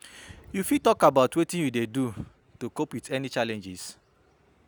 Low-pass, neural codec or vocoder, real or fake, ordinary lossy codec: none; none; real; none